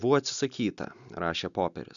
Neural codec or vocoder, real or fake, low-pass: none; real; 7.2 kHz